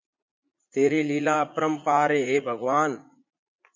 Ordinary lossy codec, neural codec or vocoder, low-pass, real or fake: MP3, 64 kbps; vocoder, 44.1 kHz, 80 mel bands, Vocos; 7.2 kHz; fake